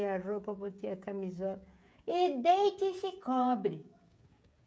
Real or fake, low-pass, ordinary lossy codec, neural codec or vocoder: fake; none; none; codec, 16 kHz, 16 kbps, FreqCodec, smaller model